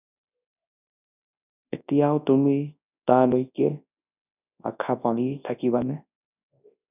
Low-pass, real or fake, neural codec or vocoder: 3.6 kHz; fake; codec, 24 kHz, 0.9 kbps, WavTokenizer, large speech release